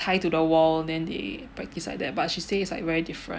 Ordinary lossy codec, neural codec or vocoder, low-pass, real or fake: none; none; none; real